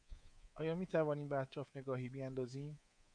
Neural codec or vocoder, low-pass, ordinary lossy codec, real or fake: codec, 24 kHz, 3.1 kbps, DualCodec; 9.9 kHz; MP3, 64 kbps; fake